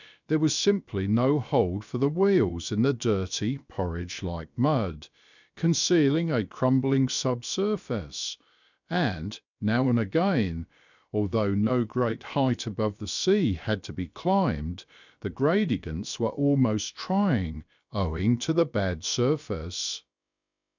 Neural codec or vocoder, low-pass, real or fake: codec, 16 kHz, about 1 kbps, DyCAST, with the encoder's durations; 7.2 kHz; fake